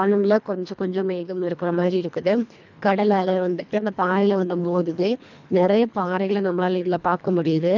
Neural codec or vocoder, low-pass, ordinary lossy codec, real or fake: codec, 24 kHz, 1.5 kbps, HILCodec; 7.2 kHz; none; fake